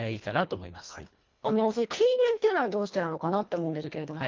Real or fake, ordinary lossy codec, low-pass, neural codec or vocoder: fake; Opus, 24 kbps; 7.2 kHz; codec, 24 kHz, 1.5 kbps, HILCodec